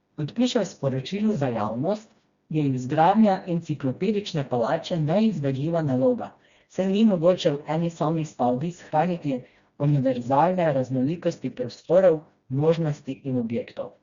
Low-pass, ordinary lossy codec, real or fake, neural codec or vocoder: 7.2 kHz; Opus, 64 kbps; fake; codec, 16 kHz, 1 kbps, FreqCodec, smaller model